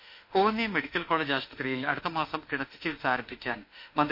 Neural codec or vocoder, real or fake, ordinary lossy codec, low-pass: autoencoder, 48 kHz, 32 numbers a frame, DAC-VAE, trained on Japanese speech; fake; MP3, 32 kbps; 5.4 kHz